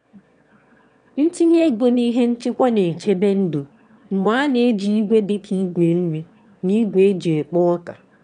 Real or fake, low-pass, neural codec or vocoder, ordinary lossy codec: fake; 9.9 kHz; autoencoder, 22.05 kHz, a latent of 192 numbers a frame, VITS, trained on one speaker; none